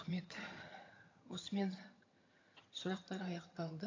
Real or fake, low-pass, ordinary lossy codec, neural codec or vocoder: fake; 7.2 kHz; AAC, 32 kbps; vocoder, 22.05 kHz, 80 mel bands, HiFi-GAN